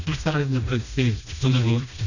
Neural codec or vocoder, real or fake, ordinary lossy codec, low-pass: codec, 16 kHz, 1 kbps, FreqCodec, smaller model; fake; none; 7.2 kHz